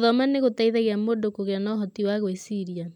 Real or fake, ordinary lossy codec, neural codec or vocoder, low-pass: real; none; none; 19.8 kHz